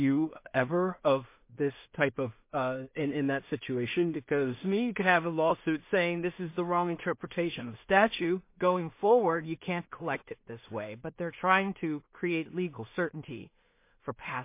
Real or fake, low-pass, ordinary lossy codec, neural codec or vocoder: fake; 3.6 kHz; MP3, 24 kbps; codec, 16 kHz in and 24 kHz out, 0.4 kbps, LongCat-Audio-Codec, two codebook decoder